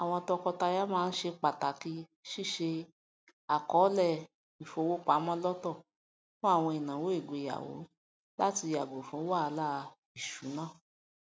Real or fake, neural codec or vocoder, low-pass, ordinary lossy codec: real; none; none; none